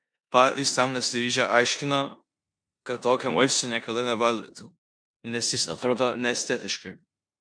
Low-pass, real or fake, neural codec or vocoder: 9.9 kHz; fake; codec, 16 kHz in and 24 kHz out, 0.9 kbps, LongCat-Audio-Codec, four codebook decoder